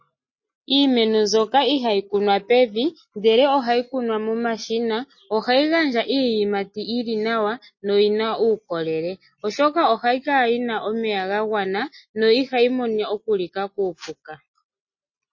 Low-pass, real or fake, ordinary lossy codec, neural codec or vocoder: 7.2 kHz; real; MP3, 32 kbps; none